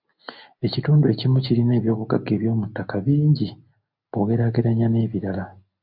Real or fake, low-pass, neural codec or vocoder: real; 5.4 kHz; none